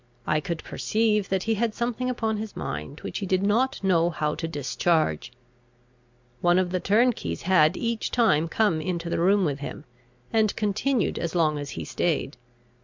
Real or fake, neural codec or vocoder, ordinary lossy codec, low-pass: real; none; MP3, 64 kbps; 7.2 kHz